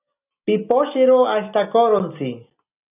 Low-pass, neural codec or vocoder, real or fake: 3.6 kHz; none; real